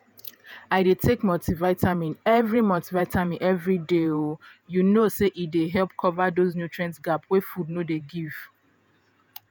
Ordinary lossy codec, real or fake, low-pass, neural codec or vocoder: none; fake; none; vocoder, 48 kHz, 128 mel bands, Vocos